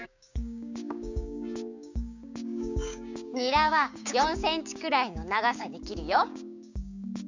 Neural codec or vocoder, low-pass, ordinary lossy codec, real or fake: codec, 16 kHz, 6 kbps, DAC; 7.2 kHz; none; fake